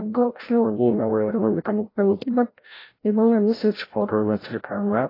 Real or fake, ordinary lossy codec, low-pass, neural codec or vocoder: fake; AAC, 24 kbps; 5.4 kHz; codec, 16 kHz, 0.5 kbps, FreqCodec, larger model